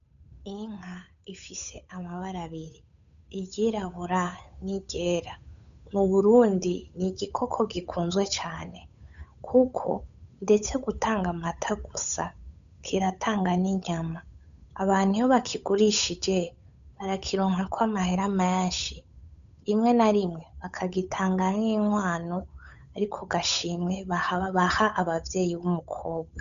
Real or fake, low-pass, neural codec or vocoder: fake; 7.2 kHz; codec, 16 kHz, 8 kbps, FunCodec, trained on Chinese and English, 25 frames a second